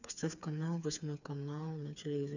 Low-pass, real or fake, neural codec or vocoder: 7.2 kHz; fake; codec, 16 kHz, 4 kbps, FreqCodec, smaller model